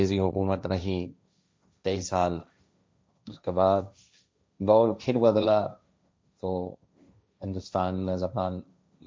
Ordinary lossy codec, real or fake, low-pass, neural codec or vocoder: none; fake; none; codec, 16 kHz, 1.1 kbps, Voila-Tokenizer